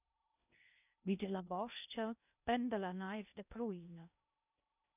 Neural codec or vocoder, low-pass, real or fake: codec, 16 kHz in and 24 kHz out, 0.6 kbps, FocalCodec, streaming, 2048 codes; 3.6 kHz; fake